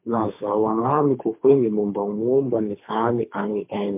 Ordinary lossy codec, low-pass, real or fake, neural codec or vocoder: MP3, 32 kbps; 3.6 kHz; fake; codec, 24 kHz, 3 kbps, HILCodec